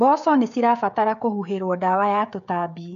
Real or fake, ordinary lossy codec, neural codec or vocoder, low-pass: fake; none; codec, 16 kHz, 16 kbps, FreqCodec, smaller model; 7.2 kHz